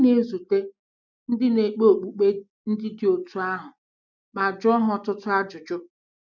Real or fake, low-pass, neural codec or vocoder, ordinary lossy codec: real; 7.2 kHz; none; none